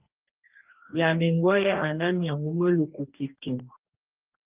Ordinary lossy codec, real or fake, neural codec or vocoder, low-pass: Opus, 24 kbps; fake; codec, 44.1 kHz, 2.6 kbps, DAC; 3.6 kHz